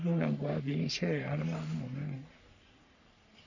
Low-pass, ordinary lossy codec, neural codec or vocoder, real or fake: 7.2 kHz; none; codec, 44.1 kHz, 3.4 kbps, Pupu-Codec; fake